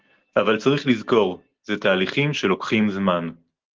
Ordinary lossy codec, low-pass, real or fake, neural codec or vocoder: Opus, 16 kbps; 7.2 kHz; real; none